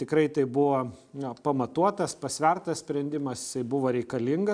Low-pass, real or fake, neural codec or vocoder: 9.9 kHz; real; none